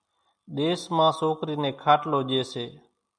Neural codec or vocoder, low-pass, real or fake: none; 9.9 kHz; real